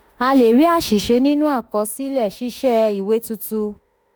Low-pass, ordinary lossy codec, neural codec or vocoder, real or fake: none; none; autoencoder, 48 kHz, 32 numbers a frame, DAC-VAE, trained on Japanese speech; fake